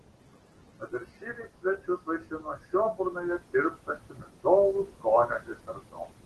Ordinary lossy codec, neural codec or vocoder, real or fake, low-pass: Opus, 16 kbps; none; real; 10.8 kHz